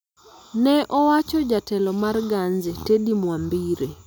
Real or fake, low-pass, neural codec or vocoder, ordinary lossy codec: real; none; none; none